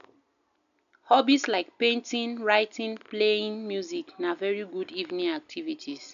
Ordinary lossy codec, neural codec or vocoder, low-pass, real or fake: none; none; 7.2 kHz; real